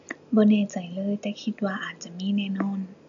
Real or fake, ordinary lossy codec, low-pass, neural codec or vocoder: real; none; 7.2 kHz; none